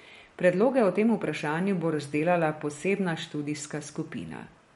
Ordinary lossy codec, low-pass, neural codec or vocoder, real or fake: MP3, 48 kbps; 19.8 kHz; none; real